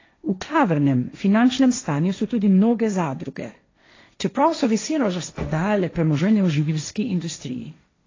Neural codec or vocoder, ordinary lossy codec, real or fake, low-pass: codec, 16 kHz, 1.1 kbps, Voila-Tokenizer; AAC, 32 kbps; fake; 7.2 kHz